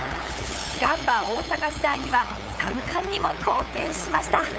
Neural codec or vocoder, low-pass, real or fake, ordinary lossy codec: codec, 16 kHz, 8 kbps, FunCodec, trained on LibriTTS, 25 frames a second; none; fake; none